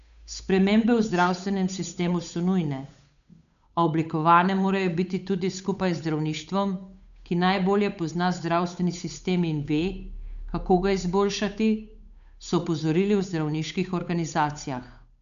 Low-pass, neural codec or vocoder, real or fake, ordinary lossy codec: 7.2 kHz; codec, 16 kHz, 8 kbps, FunCodec, trained on Chinese and English, 25 frames a second; fake; none